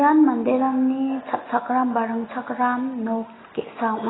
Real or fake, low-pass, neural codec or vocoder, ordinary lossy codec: real; 7.2 kHz; none; AAC, 16 kbps